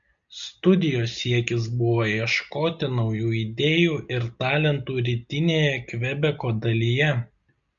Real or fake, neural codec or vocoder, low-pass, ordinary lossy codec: real; none; 7.2 kHz; MP3, 96 kbps